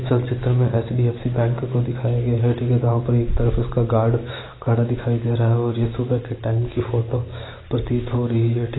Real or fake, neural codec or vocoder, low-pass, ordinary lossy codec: real; none; 7.2 kHz; AAC, 16 kbps